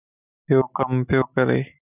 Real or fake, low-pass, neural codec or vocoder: real; 3.6 kHz; none